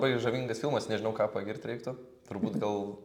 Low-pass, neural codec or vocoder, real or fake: 19.8 kHz; none; real